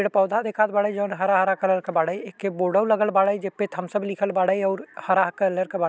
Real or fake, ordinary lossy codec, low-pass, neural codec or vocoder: real; none; none; none